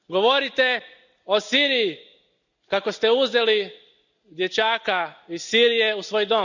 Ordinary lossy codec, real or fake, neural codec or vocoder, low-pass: none; real; none; 7.2 kHz